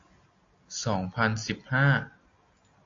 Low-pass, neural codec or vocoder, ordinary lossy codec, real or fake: 7.2 kHz; none; AAC, 48 kbps; real